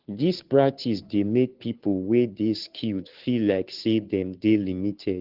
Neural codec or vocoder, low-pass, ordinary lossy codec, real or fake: codec, 16 kHz, 2 kbps, FunCodec, trained on Chinese and English, 25 frames a second; 5.4 kHz; Opus, 16 kbps; fake